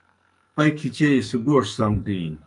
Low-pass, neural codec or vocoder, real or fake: 10.8 kHz; codec, 44.1 kHz, 2.6 kbps, SNAC; fake